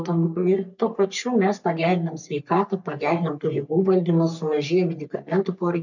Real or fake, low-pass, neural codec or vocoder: fake; 7.2 kHz; codec, 44.1 kHz, 3.4 kbps, Pupu-Codec